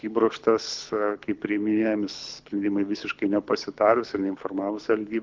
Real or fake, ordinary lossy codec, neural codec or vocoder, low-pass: fake; Opus, 32 kbps; codec, 24 kHz, 6 kbps, HILCodec; 7.2 kHz